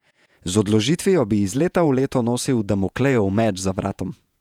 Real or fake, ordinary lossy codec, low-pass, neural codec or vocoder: fake; none; 19.8 kHz; vocoder, 48 kHz, 128 mel bands, Vocos